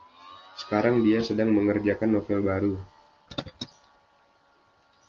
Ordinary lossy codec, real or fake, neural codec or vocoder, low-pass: Opus, 32 kbps; real; none; 7.2 kHz